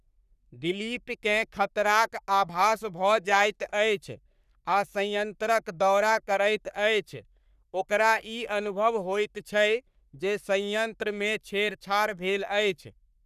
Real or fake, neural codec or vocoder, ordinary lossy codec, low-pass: fake; codec, 44.1 kHz, 3.4 kbps, Pupu-Codec; none; 14.4 kHz